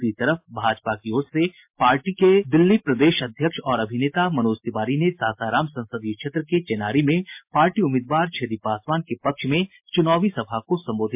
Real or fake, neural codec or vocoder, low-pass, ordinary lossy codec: real; none; 3.6 kHz; MP3, 32 kbps